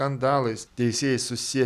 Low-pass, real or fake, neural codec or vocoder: 14.4 kHz; real; none